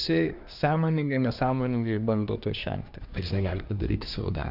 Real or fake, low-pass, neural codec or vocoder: fake; 5.4 kHz; codec, 24 kHz, 1 kbps, SNAC